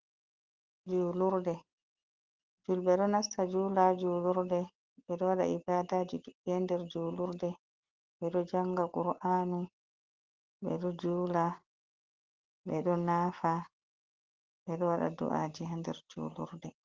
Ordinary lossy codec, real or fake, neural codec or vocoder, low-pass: Opus, 16 kbps; real; none; 7.2 kHz